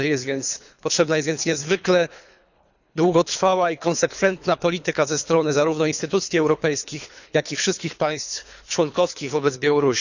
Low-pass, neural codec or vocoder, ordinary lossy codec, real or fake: 7.2 kHz; codec, 24 kHz, 3 kbps, HILCodec; none; fake